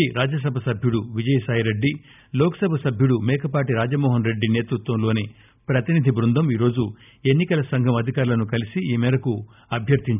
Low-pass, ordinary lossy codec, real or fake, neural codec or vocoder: 3.6 kHz; none; real; none